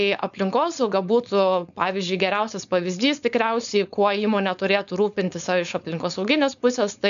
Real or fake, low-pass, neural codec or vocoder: fake; 7.2 kHz; codec, 16 kHz, 4.8 kbps, FACodec